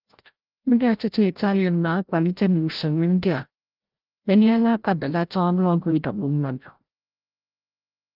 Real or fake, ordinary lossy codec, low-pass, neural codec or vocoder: fake; Opus, 32 kbps; 5.4 kHz; codec, 16 kHz, 0.5 kbps, FreqCodec, larger model